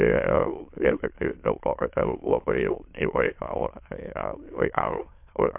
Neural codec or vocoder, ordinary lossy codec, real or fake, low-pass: autoencoder, 22.05 kHz, a latent of 192 numbers a frame, VITS, trained on many speakers; MP3, 32 kbps; fake; 3.6 kHz